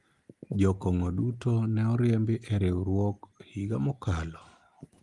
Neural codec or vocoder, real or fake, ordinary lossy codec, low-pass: none; real; Opus, 24 kbps; 10.8 kHz